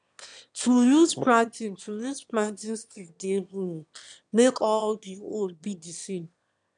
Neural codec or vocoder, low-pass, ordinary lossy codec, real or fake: autoencoder, 22.05 kHz, a latent of 192 numbers a frame, VITS, trained on one speaker; 9.9 kHz; none; fake